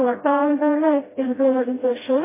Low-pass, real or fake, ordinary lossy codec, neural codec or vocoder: 3.6 kHz; fake; MP3, 16 kbps; codec, 16 kHz, 0.5 kbps, FreqCodec, smaller model